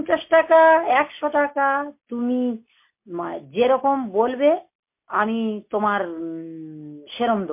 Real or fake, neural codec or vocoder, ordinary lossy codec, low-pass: real; none; MP3, 24 kbps; 3.6 kHz